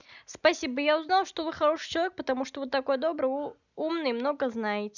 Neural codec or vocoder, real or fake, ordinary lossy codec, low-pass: none; real; none; 7.2 kHz